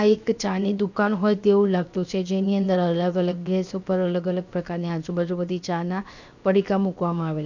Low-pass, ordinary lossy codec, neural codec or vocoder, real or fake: 7.2 kHz; none; codec, 16 kHz, 0.7 kbps, FocalCodec; fake